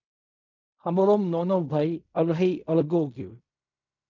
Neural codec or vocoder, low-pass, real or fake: codec, 16 kHz in and 24 kHz out, 0.4 kbps, LongCat-Audio-Codec, fine tuned four codebook decoder; 7.2 kHz; fake